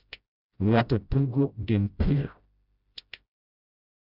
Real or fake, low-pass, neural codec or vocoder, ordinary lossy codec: fake; 5.4 kHz; codec, 16 kHz, 0.5 kbps, FreqCodec, smaller model; none